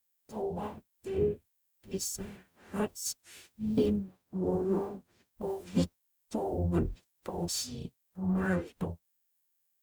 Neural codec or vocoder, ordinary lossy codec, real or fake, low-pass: codec, 44.1 kHz, 0.9 kbps, DAC; none; fake; none